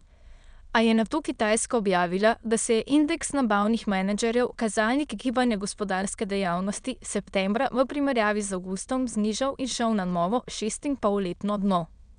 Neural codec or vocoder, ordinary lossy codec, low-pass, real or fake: autoencoder, 22.05 kHz, a latent of 192 numbers a frame, VITS, trained on many speakers; none; 9.9 kHz; fake